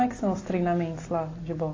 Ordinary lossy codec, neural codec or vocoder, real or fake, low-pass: AAC, 32 kbps; none; real; 7.2 kHz